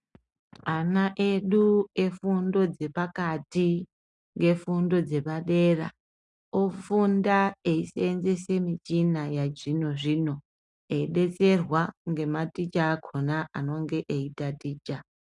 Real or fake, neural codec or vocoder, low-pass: real; none; 10.8 kHz